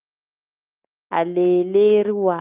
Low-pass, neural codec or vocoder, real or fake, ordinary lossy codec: 3.6 kHz; none; real; Opus, 24 kbps